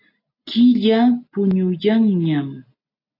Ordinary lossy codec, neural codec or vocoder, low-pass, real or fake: AAC, 32 kbps; none; 5.4 kHz; real